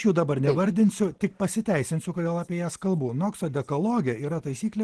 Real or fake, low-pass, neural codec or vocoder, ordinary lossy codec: real; 10.8 kHz; none; Opus, 16 kbps